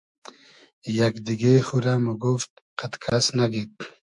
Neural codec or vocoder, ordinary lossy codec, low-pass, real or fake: autoencoder, 48 kHz, 128 numbers a frame, DAC-VAE, trained on Japanese speech; MP3, 96 kbps; 10.8 kHz; fake